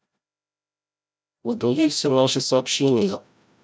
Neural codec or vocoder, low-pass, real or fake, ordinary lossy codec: codec, 16 kHz, 0.5 kbps, FreqCodec, larger model; none; fake; none